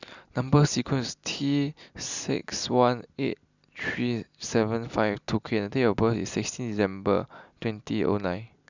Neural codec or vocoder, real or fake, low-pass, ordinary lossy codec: none; real; 7.2 kHz; none